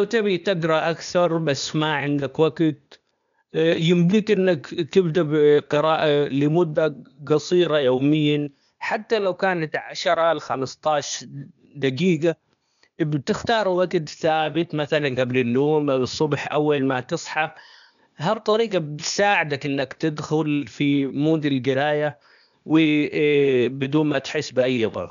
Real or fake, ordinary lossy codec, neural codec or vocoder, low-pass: fake; none; codec, 16 kHz, 0.8 kbps, ZipCodec; 7.2 kHz